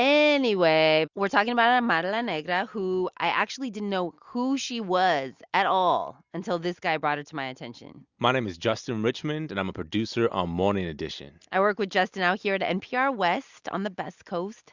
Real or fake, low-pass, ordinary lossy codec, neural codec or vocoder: real; 7.2 kHz; Opus, 64 kbps; none